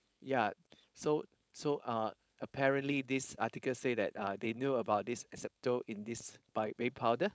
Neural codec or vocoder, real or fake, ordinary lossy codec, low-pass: codec, 16 kHz, 4.8 kbps, FACodec; fake; none; none